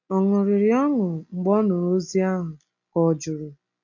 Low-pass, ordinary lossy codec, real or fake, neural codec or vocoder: 7.2 kHz; AAC, 48 kbps; real; none